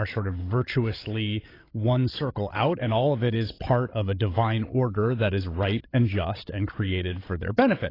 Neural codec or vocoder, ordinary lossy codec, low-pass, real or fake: codec, 16 kHz, 16 kbps, FreqCodec, larger model; AAC, 24 kbps; 5.4 kHz; fake